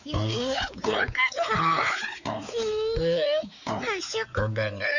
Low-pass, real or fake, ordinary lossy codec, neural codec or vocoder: 7.2 kHz; fake; none; codec, 16 kHz, 4 kbps, X-Codec, WavLM features, trained on Multilingual LibriSpeech